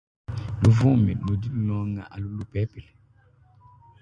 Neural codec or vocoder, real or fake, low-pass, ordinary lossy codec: none; real; 9.9 kHz; MP3, 96 kbps